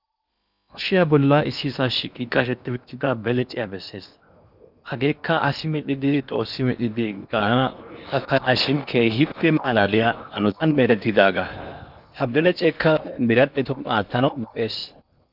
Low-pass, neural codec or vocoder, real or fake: 5.4 kHz; codec, 16 kHz in and 24 kHz out, 0.8 kbps, FocalCodec, streaming, 65536 codes; fake